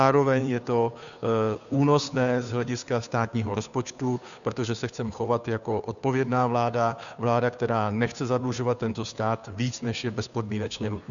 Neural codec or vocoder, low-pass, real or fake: codec, 16 kHz, 2 kbps, FunCodec, trained on Chinese and English, 25 frames a second; 7.2 kHz; fake